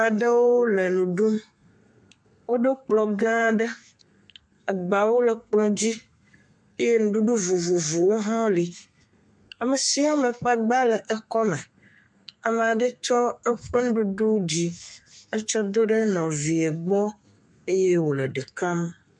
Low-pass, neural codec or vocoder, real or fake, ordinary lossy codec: 10.8 kHz; codec, 32 kHz, 1.9 kbps, SNAC; fake; MP3, 64 kbps